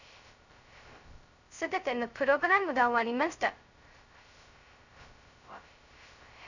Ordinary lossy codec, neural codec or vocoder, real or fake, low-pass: none; codec, 16 kHz, 0.2 kbps, FocalCodec; fake; 7.2 kHz